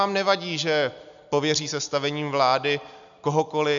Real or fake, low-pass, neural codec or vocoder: real; 7.2 kHz; none